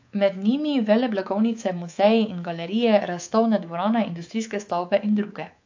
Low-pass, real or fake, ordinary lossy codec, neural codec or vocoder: 7.2 kHz; fake; AAC, 48 kbps; codec, 24 kHz, 3.1 kbps, DualCodec